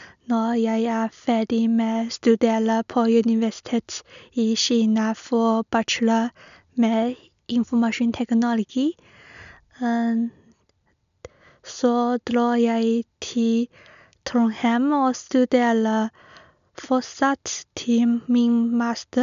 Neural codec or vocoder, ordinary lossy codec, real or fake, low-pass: none; none; real; 7.2 kHz